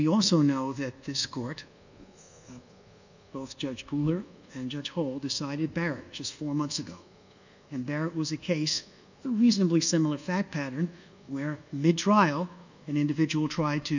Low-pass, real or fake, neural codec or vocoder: 7.2 kHz; fake; codec, 24 kHz, 1.2 kbps, DualCodec